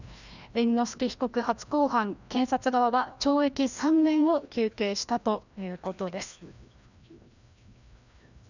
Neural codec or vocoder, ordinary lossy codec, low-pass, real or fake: codec, 16 kHz, 1 kbps, FreqCodec, larger model; none; 7.2 kHz; fake